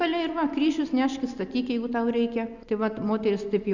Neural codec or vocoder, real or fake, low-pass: none; real; 7.2 kHz